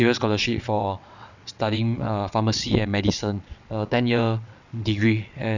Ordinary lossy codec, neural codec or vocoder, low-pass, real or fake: none; vocoder, 22.05 kHz, 80 mel bands, WaveNeXt; 7.2 kHz; fake